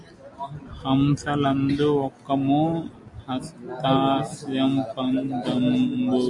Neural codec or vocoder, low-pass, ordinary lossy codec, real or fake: none; 10.8 kHz; MP3, 48 kbps; real